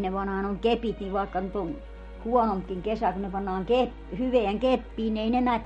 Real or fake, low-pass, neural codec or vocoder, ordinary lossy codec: real; 19.8 kHz; none; MP3, 48 kbps